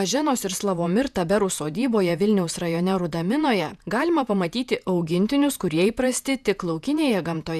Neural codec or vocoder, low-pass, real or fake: vocoder, 48 kHz, 128 mel bands, Vocos; 14.4 kHz; fake